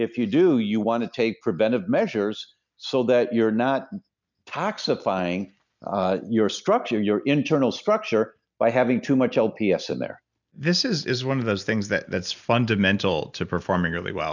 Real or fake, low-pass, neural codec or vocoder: real; 7.2 kHz; none